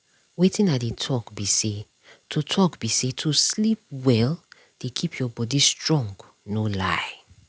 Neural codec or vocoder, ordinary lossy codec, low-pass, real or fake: none; none; none; real